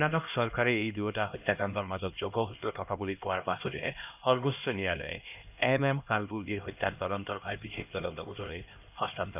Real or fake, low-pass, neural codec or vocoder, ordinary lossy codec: fake; 3.6 kHz; codec, 16 kHz, 1 kbps, X-Codec, HuBERT features, trained on LibriSpeech; none